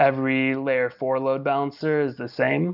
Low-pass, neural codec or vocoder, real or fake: 5.4 kHz; none; real